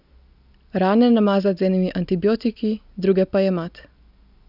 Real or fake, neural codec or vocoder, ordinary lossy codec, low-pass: real; none; none; 5.4 kHz